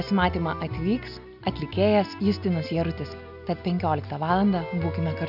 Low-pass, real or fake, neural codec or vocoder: 5.4 kHz; real; none